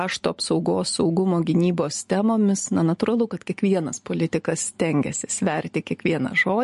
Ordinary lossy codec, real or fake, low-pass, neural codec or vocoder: MP3, 48 kbps; real; 14.4 kHz; none